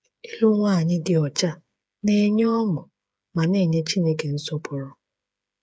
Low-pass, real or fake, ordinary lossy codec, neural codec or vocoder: none; fake; none; codec, 16 kHz, 8 kbps, FreqCodec, smaller model